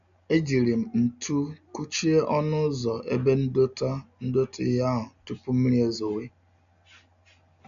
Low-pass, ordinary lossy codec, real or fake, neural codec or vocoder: 7.2 kHz; none; real; none